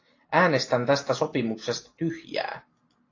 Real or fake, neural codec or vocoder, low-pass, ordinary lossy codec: real; none; 7.2 kHz; AAC, 32 kbps